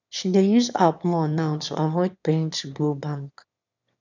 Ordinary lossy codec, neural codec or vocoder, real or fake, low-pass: none; autoencoder, 22.05 kHz, a latent of 192 numbers a frame, VITS, trained on one speaker; fake; 7.2 kHz